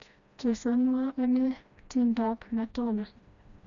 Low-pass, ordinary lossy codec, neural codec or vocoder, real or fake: 7.2 kHz; none; codec, 16 kHz, 1 kbps, FreqCodec, smaller model; fake